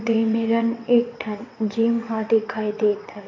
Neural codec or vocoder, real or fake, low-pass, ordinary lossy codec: vocoder, 22.05 kHz, 80 mel bands, WaveNeXt; fake; 7.2 kHz; MP3, 48 kbps